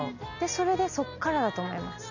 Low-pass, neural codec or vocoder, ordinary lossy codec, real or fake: 7.2 kHz; none; none; real